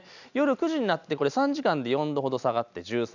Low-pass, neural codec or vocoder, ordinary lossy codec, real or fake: 7.2 kHz; none; none; real